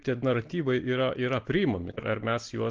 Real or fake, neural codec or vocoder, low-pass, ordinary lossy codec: real; none; 7.2 kHz; Opus, 16 kbps